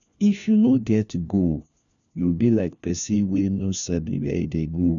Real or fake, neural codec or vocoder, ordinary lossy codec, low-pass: fake; codec, 16 kHz, 1 kbps, FunCodec, trained on LibriTTS, 50 frames a second; none; 7.2 kHz